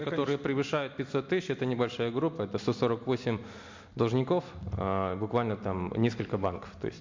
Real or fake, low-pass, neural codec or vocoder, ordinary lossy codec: real; 7.2 kHz; none; MP3, 48 kbps